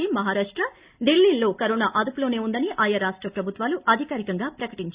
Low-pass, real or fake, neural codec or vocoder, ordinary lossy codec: 3.6 kHz; real; none; Opus, 64 kbps